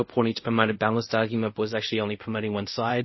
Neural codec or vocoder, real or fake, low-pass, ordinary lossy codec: codec, 24 kHz, 0.5 kbps, DualCodec; fake; 7.2 kHz; MP3, 24 kbps